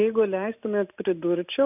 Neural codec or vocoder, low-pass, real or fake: none; 3.6 kHz; real